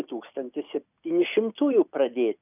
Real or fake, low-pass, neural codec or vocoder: real; 3.6 kHz; none